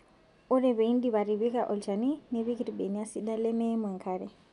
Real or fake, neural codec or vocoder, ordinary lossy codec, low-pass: real; none; none; 10.8 kHz